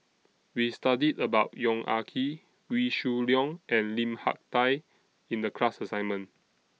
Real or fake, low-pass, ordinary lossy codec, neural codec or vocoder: real; none; none; none